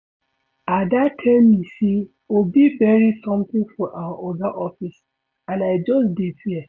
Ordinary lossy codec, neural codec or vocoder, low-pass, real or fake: none; none; 7.2 kHz; real